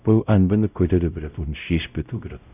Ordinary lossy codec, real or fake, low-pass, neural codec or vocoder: none; fake; 3.6 kHz; codec, 16 kHz, 0.5 kbps, X-Codec, WavLM features, trained on Multilingual LibriSpeech